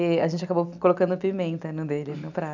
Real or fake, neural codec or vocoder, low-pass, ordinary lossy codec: real; none; 7.2 kHz; none